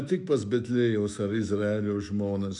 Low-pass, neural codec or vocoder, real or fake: 10.8 kHz; autoencoder, 48 kHz, 128 numbers a frame, DAC-VAE, trained on Japanese speech; fake